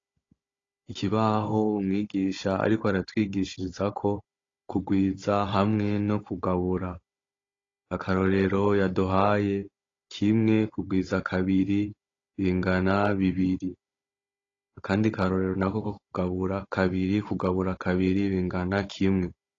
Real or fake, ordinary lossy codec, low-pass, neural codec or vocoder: fake; AAC, 32 kbps; 7.2 kHz; codec, 16 kHz, 16 kbps, FunCodec, trained on Chinese and English, 50 frames a second